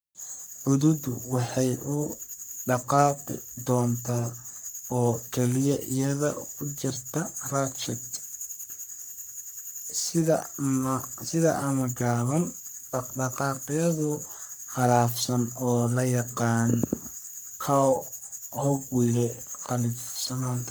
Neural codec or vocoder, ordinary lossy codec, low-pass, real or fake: codec, 44.1 kHz, 3.4 kbps, Pupu-Codec; none; none; fake